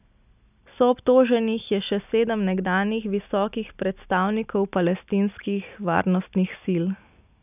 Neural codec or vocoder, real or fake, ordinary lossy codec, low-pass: none; real; none; 3.6 kHz